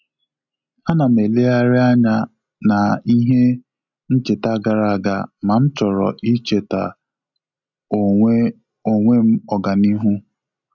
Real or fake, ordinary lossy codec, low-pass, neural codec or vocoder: real; none; 7.2 kHz; none